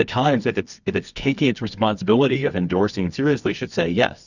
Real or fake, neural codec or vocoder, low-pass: fake; codec, 24 kHz, 0.9 kbps, WavTokenizer, medium music audio release; 7.2 kHz